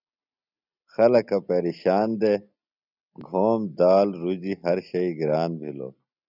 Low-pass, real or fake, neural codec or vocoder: 5.4 kHz; real; none